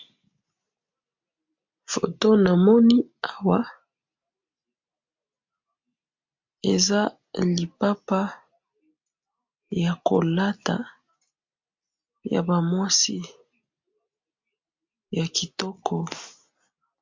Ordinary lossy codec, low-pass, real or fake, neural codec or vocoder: MP3, 48 kbps; 7.2 kHz; real; none